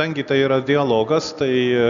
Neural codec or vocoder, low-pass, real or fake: none; 7.2 kHz; real